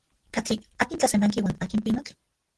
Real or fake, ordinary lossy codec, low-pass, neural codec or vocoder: real; Opus, 16 kbps; 10.8 kHz; none